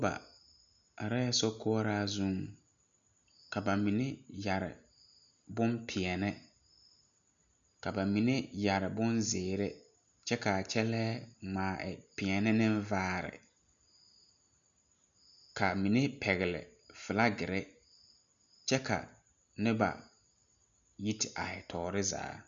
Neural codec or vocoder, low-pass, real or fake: none; 7.2 kHz; real